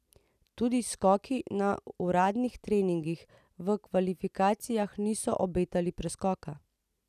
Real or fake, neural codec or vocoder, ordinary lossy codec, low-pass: real; none; none; 14.4 kHz